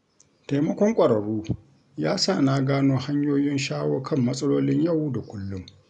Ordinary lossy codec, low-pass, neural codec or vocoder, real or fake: none; 10.8 kHz; none; real